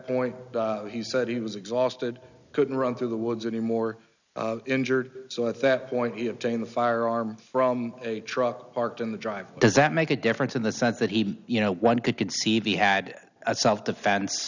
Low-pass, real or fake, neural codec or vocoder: 7.2 kHz; real; none